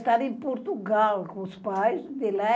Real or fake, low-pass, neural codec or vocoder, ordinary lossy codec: real; none; none; none